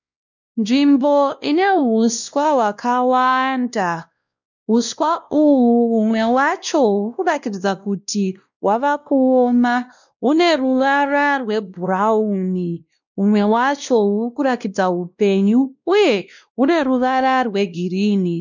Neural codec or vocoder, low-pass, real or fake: codec, 16 kHz, 1 kbps, X-Codec, WavLM features, trained on Multilingual LibriSpeech; 7.2 kHz; fake